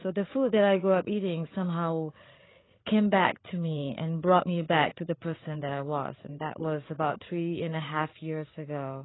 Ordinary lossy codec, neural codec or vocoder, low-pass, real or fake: AAC, 16 kbps; codec, 16 kHz, 8 kbps, FreqCodec, larger model; 7.2 kHz; fake